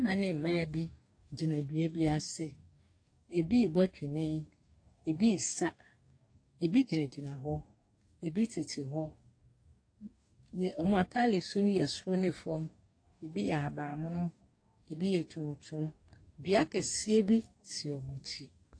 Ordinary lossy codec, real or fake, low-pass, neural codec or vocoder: AAC, 48 kbps; fake; 9.9 kHz; codec, 44.1 kHz, 2.6 kbps, DAC